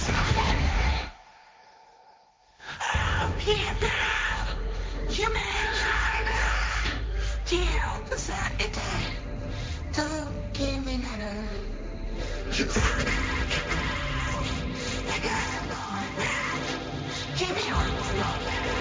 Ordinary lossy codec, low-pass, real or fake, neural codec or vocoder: none; none; fake; codec, 16 kHz, 1.1 kbps, Voila-Tokenizer